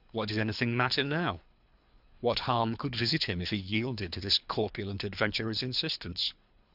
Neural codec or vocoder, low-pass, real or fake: codec, 24 kHz, 3 kbps, HILCodec; 5.4 kHz; fake